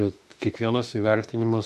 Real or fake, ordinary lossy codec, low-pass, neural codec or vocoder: fake; MP3, 64 kbps; 14.4 kHz; autoencoder, 48 kHz, 32 numbers a frame, DAC-VAE, trained on Japanese speech